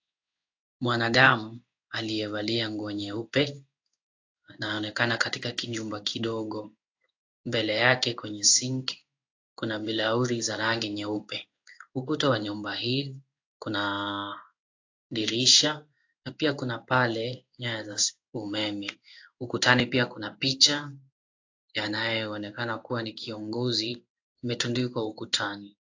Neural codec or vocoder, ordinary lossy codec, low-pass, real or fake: codec, 16 kHz in and 24 kHz out, 1 kbps, XY-Tokenizer; AAC, 48 kbps; 7.2 kHz; fake